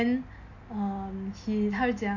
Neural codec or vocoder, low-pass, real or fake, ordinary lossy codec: none; 7.2 kHz; real; MP3, 48 kbps